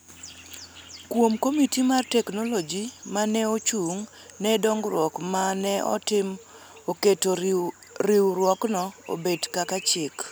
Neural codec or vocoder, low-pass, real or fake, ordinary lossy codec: none; none; real; none